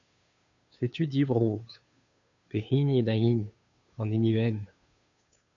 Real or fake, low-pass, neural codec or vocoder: fake; 7.2 kHz; codec, 16 kHz, 2 kbps, FunCodec, trained on Chinese and English, 25 frames a second